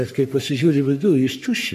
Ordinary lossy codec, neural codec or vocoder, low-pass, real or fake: MP3, 64 kbps; codec, 44.1 kHz, 3.4 kbps, Pupu-Codec; 14.4 kHz; fake